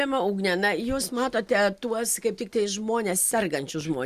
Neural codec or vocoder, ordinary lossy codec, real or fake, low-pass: none; Opus, 64 kbps; real; 14.4 kHz